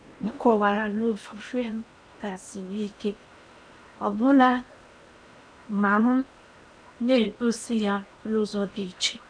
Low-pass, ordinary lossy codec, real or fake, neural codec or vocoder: 9.9 kHz; none; fake; codec, 16 kHz in and 24 kHz out, 0.8 kbps, FocalCodec, streaming, 65536 codes